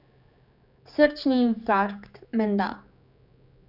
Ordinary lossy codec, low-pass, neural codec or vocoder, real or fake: none; 5.4 kHz; codec, 16 kHz, 4 kbps, X-Codec, HuBERT features, trained on general audio; fake